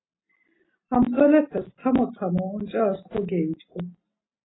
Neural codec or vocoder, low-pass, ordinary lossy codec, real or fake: none; 7.2 kHz; AAC, 16 kbps; real